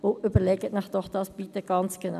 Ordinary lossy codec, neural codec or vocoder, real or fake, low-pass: none; none; real; 14.4 kHz